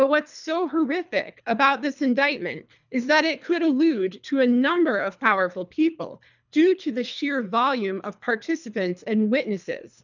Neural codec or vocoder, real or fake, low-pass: codec, 24 kHz, 3 kbps, HILCodec; fake; 7.2 kHz